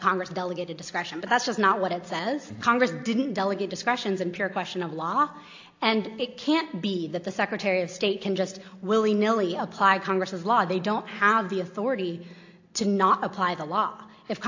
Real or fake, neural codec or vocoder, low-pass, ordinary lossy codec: real; none; 7.2 kHz; AAC, 48 kbps